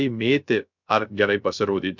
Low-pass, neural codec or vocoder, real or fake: 7.2 kHz; codec, 16 kHz, 0.3 kbps, FocalCodec; fake